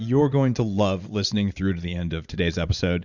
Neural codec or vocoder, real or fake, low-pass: vocoder, 44.1 kHz, 80 mel bands, Vocos; fake; 7.2 kHz